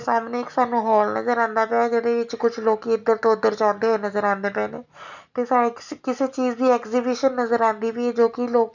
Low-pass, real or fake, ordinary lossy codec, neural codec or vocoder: 7.2 kHz; real; none; none